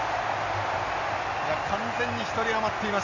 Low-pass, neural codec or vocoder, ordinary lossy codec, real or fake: 7.2 kHz; none; none; real